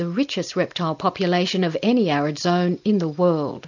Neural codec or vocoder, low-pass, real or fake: none; 7.2 kHz; real